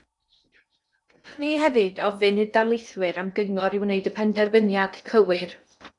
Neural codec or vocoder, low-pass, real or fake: codec, 16 kHz in and 24 kHz out, 0.8 kbps, FocalCodec, streaming, 65536 codes; 10.8 kHz; fake